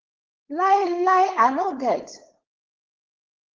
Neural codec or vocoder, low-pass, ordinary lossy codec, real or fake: codec, 16 kHz, 4.8 kbps, FACodec; 7.2 kHz; Opus, 16 kbps; fake